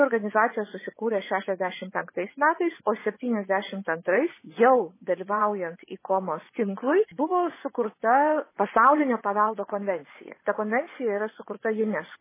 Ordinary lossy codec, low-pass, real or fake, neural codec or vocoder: MP3, 16 kbps; 3.6 kHz; fake; vocoder, 24 kHz, 100 mel bands, Vocos